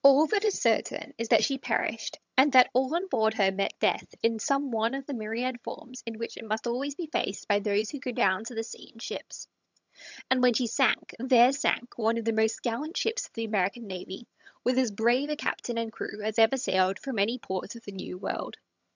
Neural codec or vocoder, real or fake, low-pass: vocoder, 22.05 kHz, 80 mel bands, HiFi-GAN; fake; 7.2 kHz